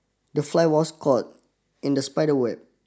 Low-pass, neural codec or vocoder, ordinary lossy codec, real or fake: none; none; none; real